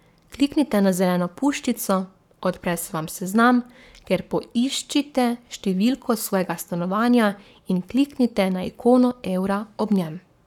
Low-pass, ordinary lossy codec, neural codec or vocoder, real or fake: 19.8 kHz; none; codec, 44.1 kHz, 7.8 kbps, Pupu-Codec; fake